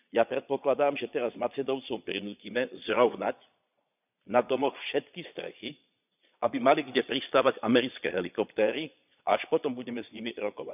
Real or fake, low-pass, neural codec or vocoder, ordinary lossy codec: fake; 3.6 kHz; vocoder, 22.05 kHz, 80 mel bands, Vocos; none